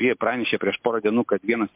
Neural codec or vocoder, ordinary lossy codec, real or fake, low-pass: none; MP3, 32 kbps; real; 3.6 kHz